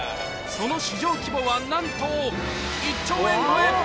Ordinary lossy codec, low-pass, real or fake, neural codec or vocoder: none; none; real; none